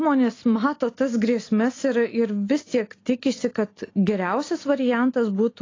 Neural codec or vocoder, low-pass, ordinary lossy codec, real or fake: none; 7.2 kHz; AAC, 32 kbps; real